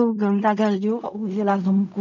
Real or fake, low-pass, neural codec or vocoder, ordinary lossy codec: fake; 7.2 kHz; codec, 16 kHz in and 24 kHz out, 0.4 kbps, LongCat-Audio-Codec, fine tuned four codebook decoder; none